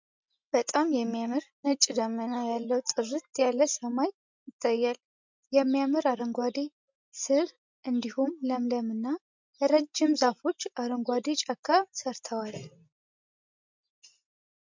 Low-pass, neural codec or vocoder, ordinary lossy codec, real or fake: 7.2 kHz; none; MP3, 64 kbps; real